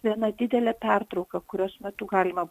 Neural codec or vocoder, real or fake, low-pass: none; real; 14.4 kHz